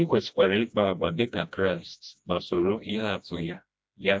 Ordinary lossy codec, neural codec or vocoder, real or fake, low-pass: none; codec, 16 kHz, 1 kbps, FreqCodec, smaller model; fake; none